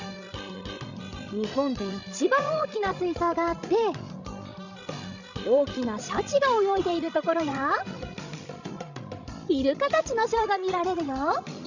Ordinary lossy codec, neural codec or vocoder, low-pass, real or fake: none; codec, 16 kHz, 16 kbps, FreqCodec, larger model; 7.2 kHz; fake